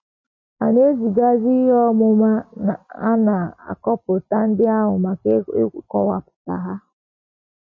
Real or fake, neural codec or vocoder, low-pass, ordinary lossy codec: real; none; 7.2 kHz; MP3, 32 kbps